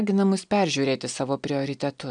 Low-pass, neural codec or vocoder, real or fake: 9.9 kHz; none; real